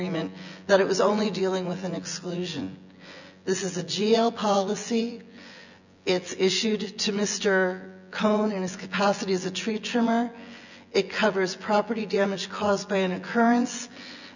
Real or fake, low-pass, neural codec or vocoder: fake; 7.2 kHz; vocoder, 24 kHz, 100 mel bands, Vocos